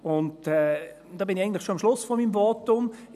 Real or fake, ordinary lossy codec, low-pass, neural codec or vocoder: real; none; 14.4 kHz; none